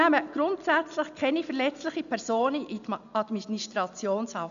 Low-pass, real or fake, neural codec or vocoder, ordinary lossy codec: 7.2 kHz; real; none; none